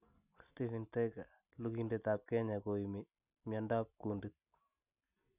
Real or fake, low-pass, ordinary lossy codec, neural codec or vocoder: real; 3.6 kHz; none; none